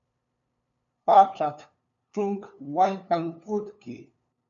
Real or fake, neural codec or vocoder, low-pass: fake; codec, 16 kHz, 2 kbps, FunCodec, trained on LibriTTS, 25 frames a second; 7.2 kHz